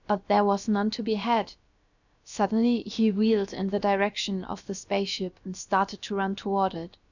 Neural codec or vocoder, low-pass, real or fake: codec, 16 kHz, about 1 kbps, DyCAST, with the encoder's durations; 7.2 kHz; fake